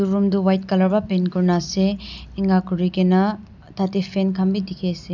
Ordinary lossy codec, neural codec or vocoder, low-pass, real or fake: none; none; 7.2 kHz; real